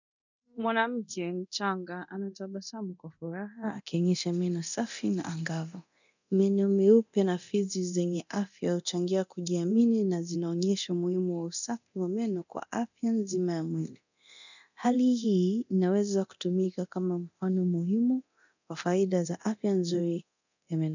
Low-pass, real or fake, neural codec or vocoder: 7.2 kHz; fake; codec, 24 kHz, 0.9 kbps, DualCodec